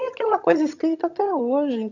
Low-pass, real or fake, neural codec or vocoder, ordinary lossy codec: 7.2 kHz; fake; vocoder, 22.05 kHz, 80 mel bands, HiFi-GAN; none